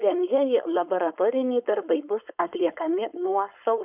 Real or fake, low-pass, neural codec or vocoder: fake; 3.6 kHz; codec, 16 kHz, 4.8 kbps, FACodec